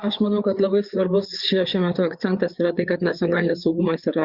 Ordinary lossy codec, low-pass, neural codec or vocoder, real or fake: Opus, 64 kbps; 5.4 kHz; codec, 16 kHz, 4 kbps, FreqCodec, larger model; fake